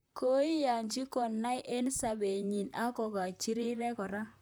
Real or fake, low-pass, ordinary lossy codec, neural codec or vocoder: fake; none; none; vocoder, 44.1 kHz, 128 mel bands, Pupu-Vocoder